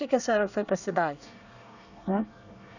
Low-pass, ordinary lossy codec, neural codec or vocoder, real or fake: 7.2 kHz; none; codec, 24 kHz, 1 kbps, SNAC; fake